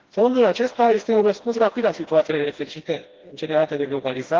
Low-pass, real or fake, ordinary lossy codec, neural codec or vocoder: 7.2 kHz; fake; Opus, 16 kbps; codec, 16 kHz, 1 kbps, FreqCodec, smaller model